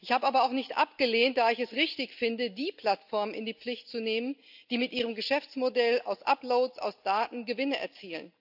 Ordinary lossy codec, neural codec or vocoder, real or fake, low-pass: AAC, 48 kbps; none; real; 5.4 kHz